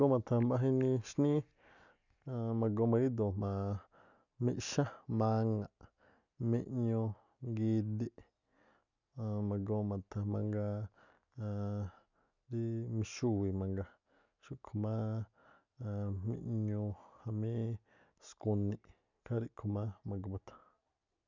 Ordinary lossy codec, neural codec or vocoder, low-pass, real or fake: none; none; 7.2 kHz; real